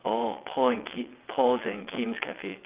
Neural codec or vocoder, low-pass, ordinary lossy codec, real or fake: vocoder, 22.05 kHz, 80 mel bands, WaveNeXt; 3.6 kHz; Opus, 24 kbps; fake